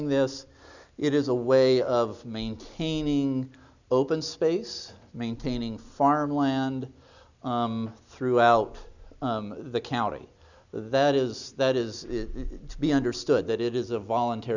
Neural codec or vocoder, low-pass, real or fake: none; 7.2 kHz; real